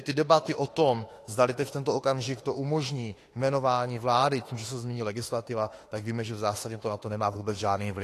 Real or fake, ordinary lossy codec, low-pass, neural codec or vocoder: fake; AAC, 48 kbps; 14.4 kHz; autoencoder, 48 kHz, 32 numbers a frame, DAC-VAE, trained on Japanese speech